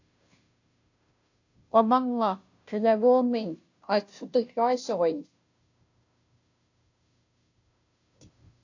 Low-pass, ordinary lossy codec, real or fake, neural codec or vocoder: 7.2 kHz; AAC, 48 kbps; fake; codec, 16 kHz, 0.5 kbps, FunCodec, trained on Chinese and English, 25 frames a second